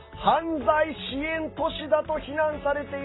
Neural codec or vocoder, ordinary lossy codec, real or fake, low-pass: none; AAC, 16 kbps; real; 7.2 kHz